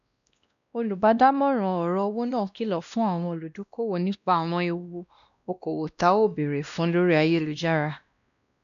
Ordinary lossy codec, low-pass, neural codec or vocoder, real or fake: none; 7.2 kHz; codec, 16 kHz, 1 kbps, X-Codec, WavLM features, trained on Multilingual LibriSpeech; fake